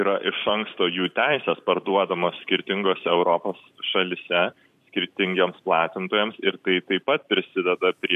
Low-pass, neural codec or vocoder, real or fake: 14.4 kHz; vocoder, 44.1 kHz, 128 mel bands every 256 samples, BigVGAN v2; fake